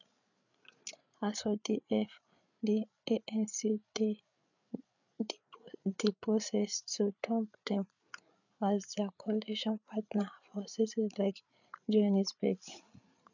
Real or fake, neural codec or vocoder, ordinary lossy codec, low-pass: fake; codec, 16 kHz, 8 kbps, FreqCodec, larger model; none; 7.2 kHz